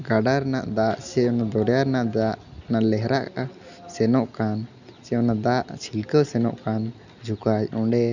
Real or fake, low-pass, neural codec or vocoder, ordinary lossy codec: real; 7.2 kHz; none; none